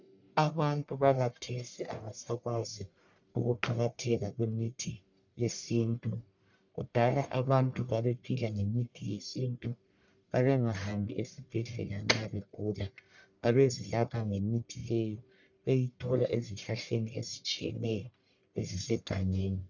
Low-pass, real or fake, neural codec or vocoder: 7.2 kHz; fake; codec, 44.1 kHz, 1.7 kbps, Pupu-Codec